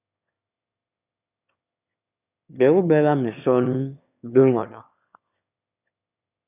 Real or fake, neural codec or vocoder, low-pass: fake; autoencoder, 22.05 kHz, a latent of 192 numbers a frame, VITS, trained on one speaker; 3.6 kHz